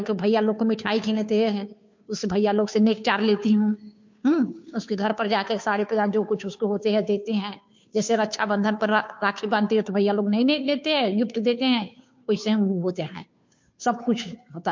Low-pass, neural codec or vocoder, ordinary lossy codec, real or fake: 7.2 kHz; codec, 16 kHz, 2 kbps, FunCodec, trained on Chinese and English, 25 frames a second; MP3, 48 kbps; fake